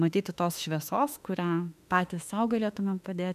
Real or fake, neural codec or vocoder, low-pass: fake; autoencoder, 48 kHz, 32 numbers a frame, DAC-VAE, trained on Japanese speech; 14.4 kHz